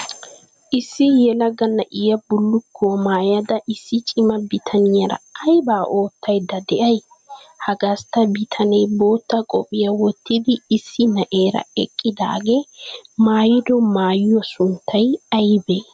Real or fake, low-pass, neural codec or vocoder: real; 9.9 kHz; none